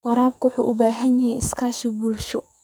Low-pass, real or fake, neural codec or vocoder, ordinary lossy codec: none; fake; codec, 44.1 kHz, 2.6 kbps, SNAC; none